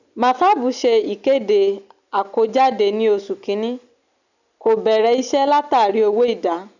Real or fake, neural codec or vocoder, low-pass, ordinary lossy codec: real; none; 7.2 kHz; none